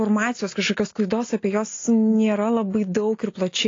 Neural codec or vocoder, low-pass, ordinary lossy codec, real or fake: none; 7.2 kHz; AAC, 32 kbps; real